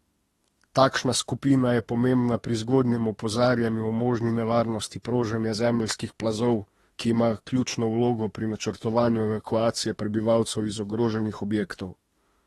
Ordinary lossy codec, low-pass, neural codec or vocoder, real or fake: AAC, 32 kbps; 19.8 kHz; autoencoder, 48 kHz, 32 numbers a frame, DAC-VAE, trained on Japanese speech; fake